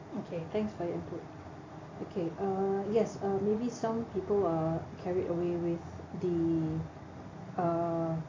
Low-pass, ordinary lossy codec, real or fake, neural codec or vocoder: 7.2 kHz; AAC, 32 kbps; real; none